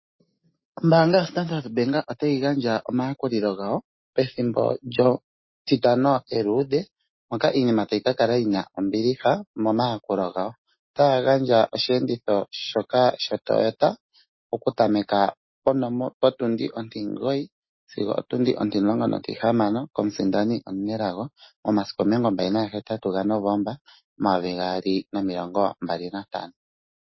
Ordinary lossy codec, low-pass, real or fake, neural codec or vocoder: MP3, 24 kbps; 7.2 kHz; real; none